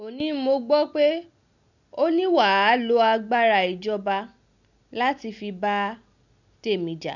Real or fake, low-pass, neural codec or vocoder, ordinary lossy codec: real; 7.2 kHz; none; none